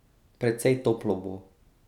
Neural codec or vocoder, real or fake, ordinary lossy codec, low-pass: none; real; none; 19.8 kHz